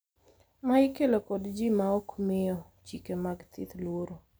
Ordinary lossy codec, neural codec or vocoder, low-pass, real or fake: none; none; none; real